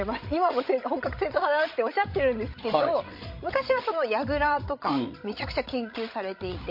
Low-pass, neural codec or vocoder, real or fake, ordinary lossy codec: 5.4 kHz; codec, 16 kHz, 16 kbps, FreqCodec, larger model; fake; MP3, 32 kbps